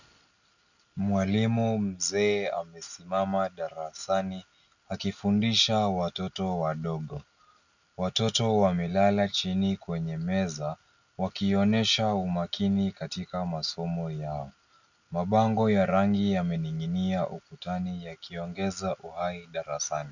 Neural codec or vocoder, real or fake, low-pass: none; real; 7.2 kHz